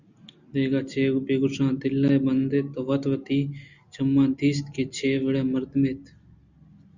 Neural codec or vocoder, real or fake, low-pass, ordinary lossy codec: none; real; 7.2 kHz; Opus, 64 kbps